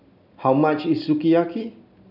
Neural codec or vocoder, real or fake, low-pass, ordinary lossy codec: none; real; 5.4 kHz; none